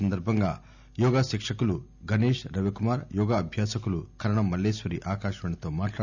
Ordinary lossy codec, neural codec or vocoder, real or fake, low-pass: none; none; real; 7.2 kHz